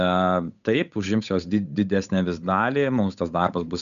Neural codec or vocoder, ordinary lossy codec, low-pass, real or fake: codec, 16 kHz, 8 kbps, FunCodec, trained on Chinese and English, 25 frames a second; MP3, 96 kbps; 7.2 kHz; fake